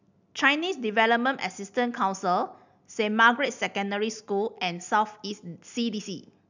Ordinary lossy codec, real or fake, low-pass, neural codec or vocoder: none; real; 7.2 kHz; none